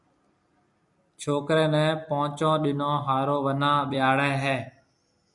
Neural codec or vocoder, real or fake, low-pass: vocoder, 44.1 kHz, 128 mel bands every 256 samples, BigVGAN v2; fake; 10.8 kHz